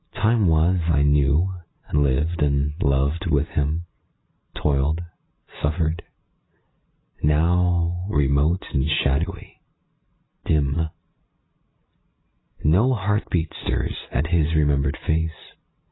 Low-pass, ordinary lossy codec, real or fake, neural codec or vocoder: 7.2 kHz; AAC, 16 kbps; real; none